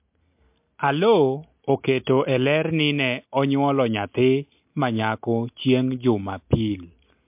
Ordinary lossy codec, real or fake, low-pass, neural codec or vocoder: MP3, 32 kbps; real; 3.6 kHz; none